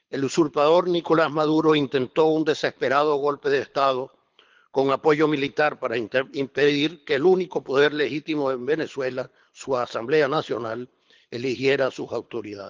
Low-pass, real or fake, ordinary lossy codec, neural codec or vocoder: 7.2 kHz; fake; Opus, 32 kbps; codec, 24 kHz, 6 kbps, HILCodec